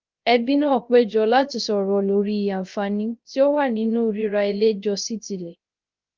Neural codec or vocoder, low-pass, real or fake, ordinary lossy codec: codec, 16 kHz, 0.3 kbps, FocalCodec; 7.2 kHz; fake; Opus, 24 kbps